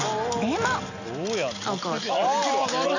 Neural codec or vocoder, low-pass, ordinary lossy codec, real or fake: none; 7.2 kHz; none; real